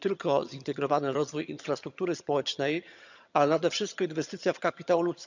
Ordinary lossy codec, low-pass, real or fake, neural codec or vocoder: none; 7.2 kHz; fake; vocoder, 22.05 kHz, 80 mel bands, HiFi-GAN